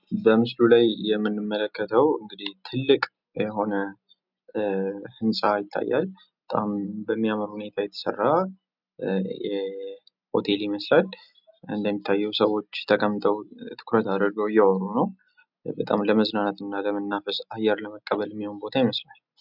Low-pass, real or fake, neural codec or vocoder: 5.4 kHz; real; none